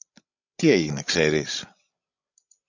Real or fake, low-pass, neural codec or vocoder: fake; 7.2 kHz; codec, 16 kHz, 16 kbps, FreqCodec, larger model